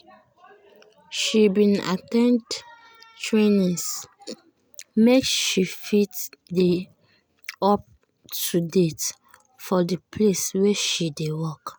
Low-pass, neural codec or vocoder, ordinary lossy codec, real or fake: none; none; none; real